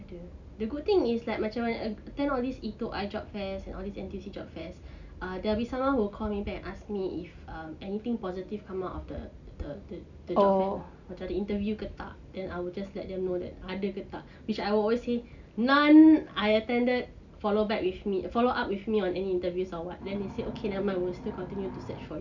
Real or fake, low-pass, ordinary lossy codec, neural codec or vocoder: real; 7.2 kHz; none; none